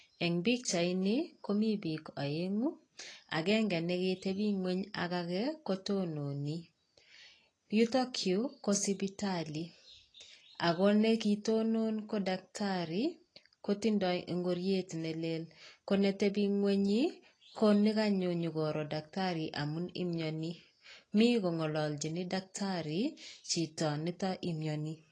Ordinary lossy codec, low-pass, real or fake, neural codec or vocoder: AAC, 32 kbps; 9.9 kHz; real; none